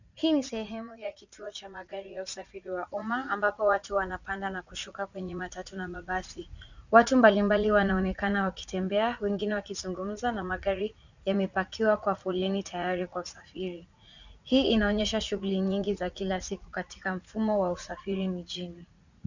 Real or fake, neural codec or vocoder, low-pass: fake; vocoder, 44.1 kHz, 80 mel bands, Vocos; 7.2 kHz